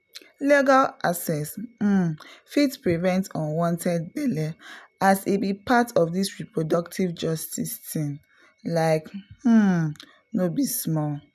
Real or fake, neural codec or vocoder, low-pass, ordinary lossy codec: real; none; 14.4 kHz; none